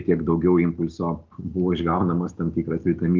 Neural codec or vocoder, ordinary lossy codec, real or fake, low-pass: none; Opus, 32 kbps; real; 7.2 kHz